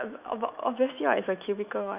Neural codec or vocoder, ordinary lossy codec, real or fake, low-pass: autoencoder, 48 kHz, 128 numbers a frame, DAC-VAE, trained on Japanese speech; none; fake; 3.6 kHz